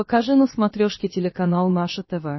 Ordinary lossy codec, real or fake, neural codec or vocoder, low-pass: MP3, 24 kbps; fake; codec, 16 kHz, about 1 kbps, DyCAST, with the encoder's durations; 7.2 kHz